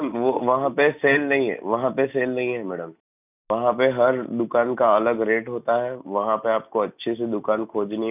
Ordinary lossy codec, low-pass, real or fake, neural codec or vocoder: none; 3.6 kHz; real; none